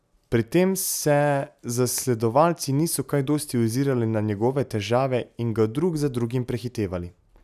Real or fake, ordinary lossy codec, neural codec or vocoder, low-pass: real; none; none; 14.4 kHz